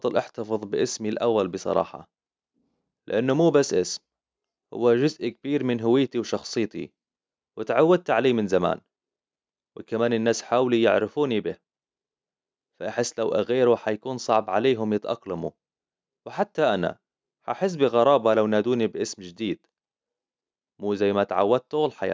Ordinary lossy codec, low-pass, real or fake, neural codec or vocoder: none; none; real; none